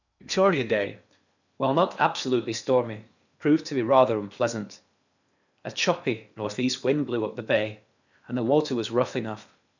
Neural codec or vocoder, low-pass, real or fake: codec, 16 kHz in and 24 kHz out, 0.8 kbps, FocalCodec, streaming, 65536 codes; 7.2 kHz; fake